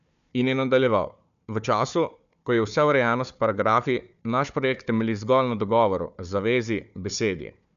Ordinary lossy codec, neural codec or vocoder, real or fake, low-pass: none; codec, 16 kHz, 4 kbps, FunCodec, trained on Chinese and English, 50 frames a second; fake; 7.2 kHz